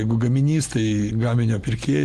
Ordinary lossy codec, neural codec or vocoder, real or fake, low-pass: Opus, 24 kbps; none; real; 14.4 kHz